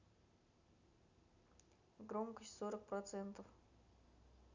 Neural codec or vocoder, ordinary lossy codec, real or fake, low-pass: none; none; real; 7.2 kHz